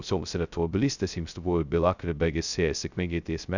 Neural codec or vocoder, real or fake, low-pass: codec, 16 kHz, 0.2 kbps, FocalCodec; fake; 7.2 kHz